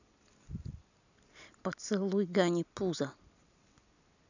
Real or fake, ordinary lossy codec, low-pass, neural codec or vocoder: real; none; 7.2 kHz; none